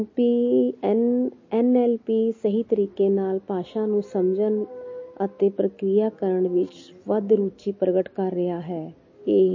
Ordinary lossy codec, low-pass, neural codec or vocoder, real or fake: MP3, 32 kbps; 7.2 kHz; none; real